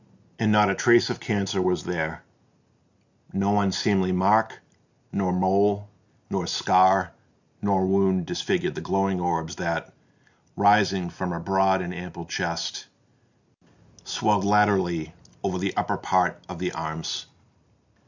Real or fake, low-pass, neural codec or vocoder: real; 7.2 kHz; none